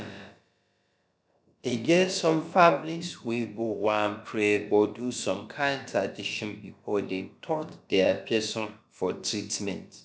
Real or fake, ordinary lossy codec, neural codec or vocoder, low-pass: fake; none; codec, 16 kHz, about 1 kbps, DyCAST, with the encoder's durations; none